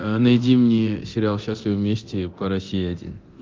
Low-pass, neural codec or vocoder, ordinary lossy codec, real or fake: 7.2 kHz; codec, 24 kHz, 0.9 kbps, DualCodec; Opus, 24 kbps; fake